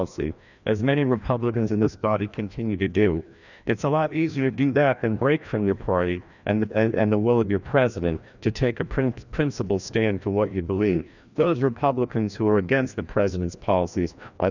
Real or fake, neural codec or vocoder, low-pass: fake; codec, 16 kHz, 1 kbps, FreqCodec, larger model; 7.2 kHz